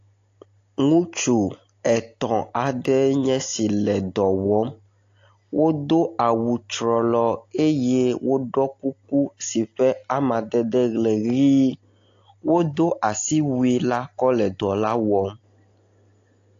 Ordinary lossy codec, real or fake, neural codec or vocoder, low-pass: AAC, 48 kbps; real; none; 7.2 kHz